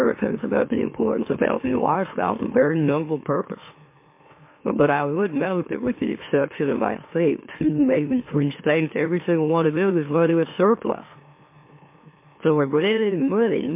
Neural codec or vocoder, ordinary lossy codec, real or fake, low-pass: autoencoder, 44.1 kHz, a latent of 192 numbers a frame, MeloTTS; MP3, 24 kbps; fake; 3.6 kHz